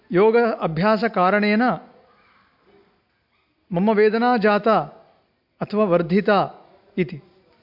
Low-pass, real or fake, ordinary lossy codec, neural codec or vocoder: 5.4 kHz; real; MP3, 48 kbps; none